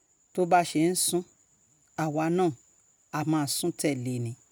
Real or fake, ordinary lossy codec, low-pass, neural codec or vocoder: real; none; none; none